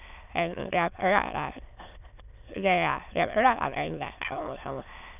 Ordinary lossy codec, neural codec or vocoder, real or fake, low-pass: none; autoencoder, 22.05 kHz, a latent of 192 numbers a frame, VITS, trained on many speakers; fake; 3.6 kHz